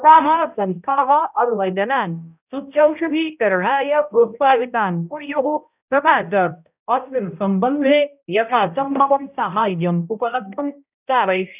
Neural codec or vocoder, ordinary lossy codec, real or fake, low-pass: codec, 16 kHz, 0.5 kbps, X-Codec, HuBERT features, trained on balanced general audio; none; fake; 3.6 kHz